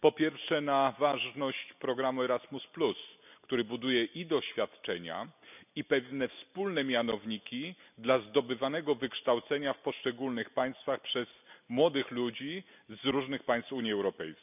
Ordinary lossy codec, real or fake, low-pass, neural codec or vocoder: none; real; 3.6 kHz; none